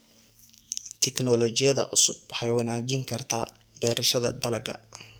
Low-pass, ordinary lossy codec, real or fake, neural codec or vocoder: none; none; fake; codec, 44.1 kHz, 2.6 kbps, SNAC